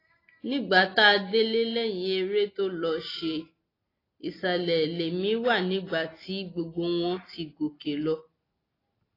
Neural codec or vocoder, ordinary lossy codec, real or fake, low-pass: none; AAC, 24 kbps; real; 5.4 kHz